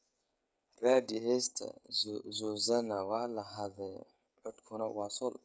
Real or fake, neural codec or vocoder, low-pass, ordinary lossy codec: fake; codec, 16 kHz, 16 kbps, FreqCodec, smaller model; none; none